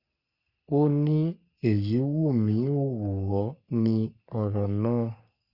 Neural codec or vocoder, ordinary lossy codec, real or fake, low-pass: codec, 44.1 kHz, 3.4 kbps, Pupu-Codec; Opus, 64 kbps; fake; 5.4 kHz